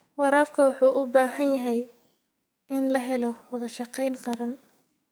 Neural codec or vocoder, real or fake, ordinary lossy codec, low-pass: codec, 44.1 kHz, 2.6 kbps, SNAC; fake; none; none